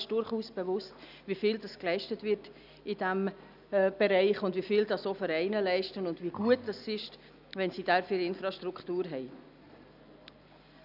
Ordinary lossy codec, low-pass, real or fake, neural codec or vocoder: none; 5.4 kHz; real; none